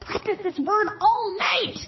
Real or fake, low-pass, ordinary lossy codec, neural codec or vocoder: fake; 7.2 kHz; MP3, 24 kbps; codec, 16 kHz, 2 kbps, X-Codec, HuBERT features, trained on balanced general audio